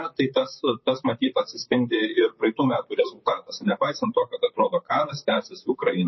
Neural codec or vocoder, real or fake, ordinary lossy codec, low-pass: vocoder, 44.1 kHz, 128 mel bands, Pupu-Vocoder; fake; MP3, 24 kbps; 7.2 kHz